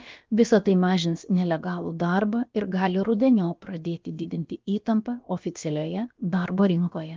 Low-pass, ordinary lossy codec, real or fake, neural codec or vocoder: 7.2 kHz; Opus, 24 kbps; fake; codec, 16 kHz, about 1 kbps, DyCAST, with the encoder's durations